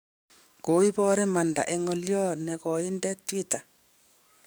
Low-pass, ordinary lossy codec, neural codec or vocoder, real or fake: none; none; codec, 44.1 kHz, 7.8 kbps, DAC; fake